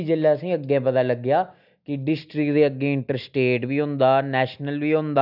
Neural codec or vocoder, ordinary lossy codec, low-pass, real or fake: none; none; 5.4 kHz; real